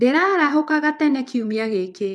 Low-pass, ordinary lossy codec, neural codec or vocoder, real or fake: none; none; vocoder, 22.05 kHz, 80 mel bands, WaveNeXt; fake